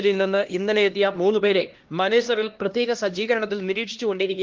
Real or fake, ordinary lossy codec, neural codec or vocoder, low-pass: fake; Opus, 16 kbps; codec, 16 kHz, 1 kbps, X-Codec, HuBERT features, trained on LibriSpeech; 7.2 kHz